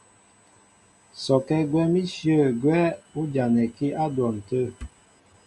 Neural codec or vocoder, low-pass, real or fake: none; 10.8 kHz; real